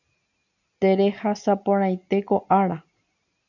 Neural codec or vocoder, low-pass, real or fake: none; 7.2 kHz; real